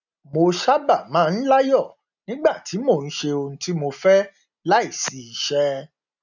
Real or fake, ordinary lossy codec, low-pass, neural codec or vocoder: real; none; 7.2 kHz; none